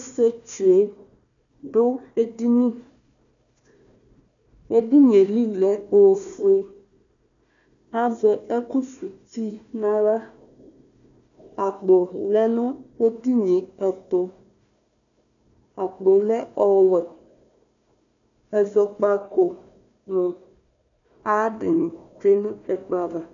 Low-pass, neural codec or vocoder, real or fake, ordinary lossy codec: 7.2 kHz; codec, 16 kHz, 1 kbps, FunCodec, trained on Chinese and English, 50 frames a second; fake; MP3, 96 kbps